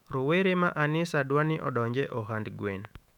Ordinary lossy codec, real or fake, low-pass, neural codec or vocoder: none; fake; 19.8 kHz; autoencoder, 48 kHz, 128 numbers a frame, DAC-VAE, trained on Japanese speech